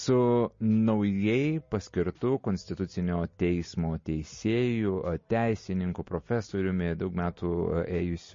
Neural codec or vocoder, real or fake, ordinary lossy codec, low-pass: none; real; MP3, 32 kbps; 7.2 kHz